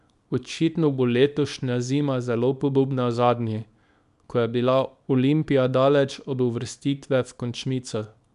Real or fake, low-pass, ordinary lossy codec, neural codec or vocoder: fake; 10.8 kHz; none; codec, 24 kHz, 0.9 kbps, WavTokenizer, small release